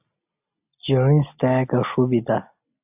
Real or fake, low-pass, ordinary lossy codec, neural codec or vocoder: real; 3.6 kHz; AAC, 32 kbps; none